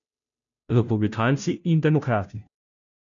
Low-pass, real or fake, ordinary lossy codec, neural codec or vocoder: 7.2 kHz; fake; none; codec, 16 kHz, 0.5 kbps, FunCodec, trained on Chinese and English, 25 frames a second